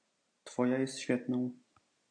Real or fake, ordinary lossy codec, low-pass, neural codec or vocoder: real; AAC, 64 kbps; 9.9 kHz; none